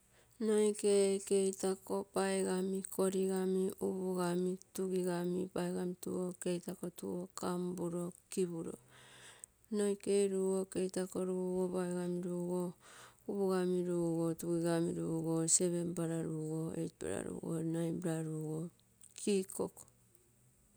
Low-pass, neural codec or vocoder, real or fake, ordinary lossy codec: none; none; real; none